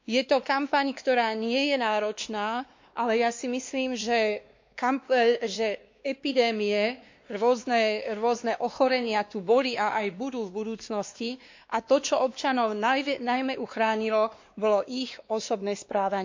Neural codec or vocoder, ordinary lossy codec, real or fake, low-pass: codec, 16 kHz, 2 kbps, X-Codec, WavLM features, trained on Multilingual LibriSpeech; MP3, 64 kbps; fake; 7.2 kHz